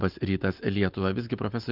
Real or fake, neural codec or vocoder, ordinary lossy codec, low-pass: real; none; Opus, 32 kbps; 5.4 kHz